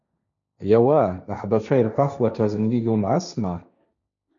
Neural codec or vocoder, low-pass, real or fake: codec, 16 kHz, 1.1 kbps, Voila-Tokenizer; 7.2 kHz; fake